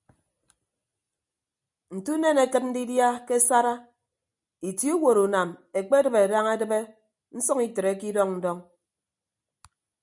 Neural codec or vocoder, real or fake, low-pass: none; real; 10.8 kHz